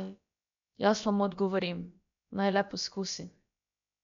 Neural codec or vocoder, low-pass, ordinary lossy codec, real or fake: codec, 16 kHz, about 1 kbps, DyCAST, with the encoder's durations; 7.2 kHz; MP3, 64 kbps; fake